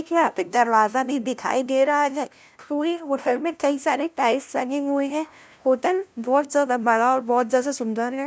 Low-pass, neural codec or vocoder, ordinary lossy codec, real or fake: none; codec, 16 kHz, 0.5 kbps, FunCodec, trained on LibriTTS, 25 frames a second; none; fake